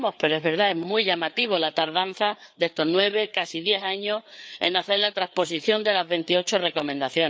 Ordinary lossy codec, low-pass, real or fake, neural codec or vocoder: none; none; fake; codec, 16 kHz, 4 kbps, FreqCodec, larger model